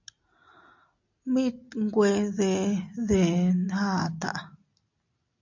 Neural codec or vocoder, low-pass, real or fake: none; 7.2 kHz; real